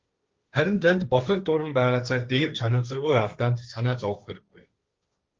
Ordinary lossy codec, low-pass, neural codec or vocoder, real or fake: Opus, 24 kbps; 7.2 kHz; codec, 16 kHz, 1.1 kbps, Voila-Tokenizer; fake